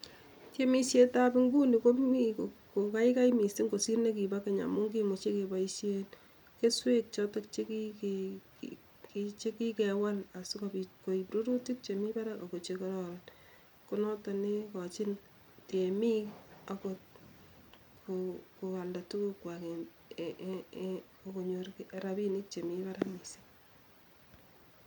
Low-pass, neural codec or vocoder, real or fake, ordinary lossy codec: 19.8 kHz; none; real; none